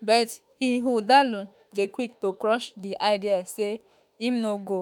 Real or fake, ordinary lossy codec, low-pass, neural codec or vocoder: fake; none; none; autoencoder, 48 kHz, 32 numbers a frame, DAC-VAE, trained on Japanese speech